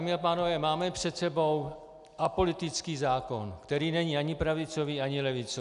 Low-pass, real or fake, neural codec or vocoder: 10.8 kHz; real; none